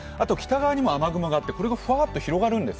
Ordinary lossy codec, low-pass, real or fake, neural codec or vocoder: none; none; real; none